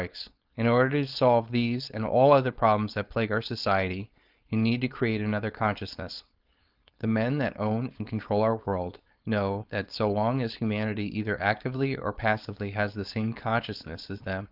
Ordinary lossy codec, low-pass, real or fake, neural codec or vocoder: Opus, 32 kbps; 5.4 kHz; fake; codec, 16 kHz, 4.8 kbps, FACodec